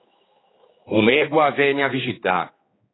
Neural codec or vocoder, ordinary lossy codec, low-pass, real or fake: codec, 16 kHz, 4 kbps, X-Codec, HuBERT features, trained on general audio; AAC, 16 kbps; 7.2 kHz; fake